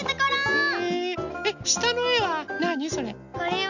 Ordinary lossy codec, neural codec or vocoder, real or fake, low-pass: none; none; real; 7.2 kHz